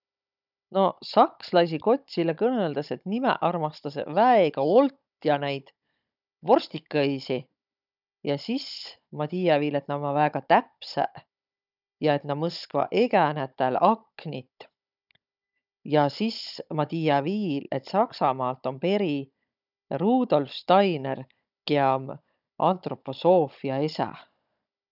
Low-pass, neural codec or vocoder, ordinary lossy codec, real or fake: 5.4 kHz; codec, 16 kHz, 16 kbps, FunCodec, trained on Chinese and English, 50 frames a second; none; fake